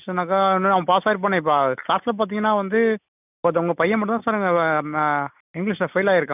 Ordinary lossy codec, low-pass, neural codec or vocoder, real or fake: none; 3.6 kHz; none; real